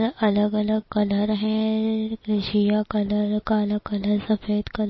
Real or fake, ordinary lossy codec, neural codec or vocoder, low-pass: real; MP3, 24 kbps; none; 7.2 kHz